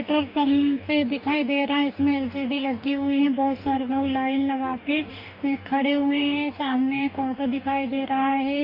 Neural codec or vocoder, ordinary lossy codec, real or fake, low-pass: codec, 44.1 kHz, 2.6 kbps, DAC; none; fake; 5.4 kHz